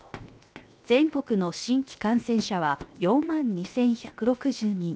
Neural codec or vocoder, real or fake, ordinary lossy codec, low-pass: codec, 16 kHz, 0.7 kbps, FocalCodec; fake; none; none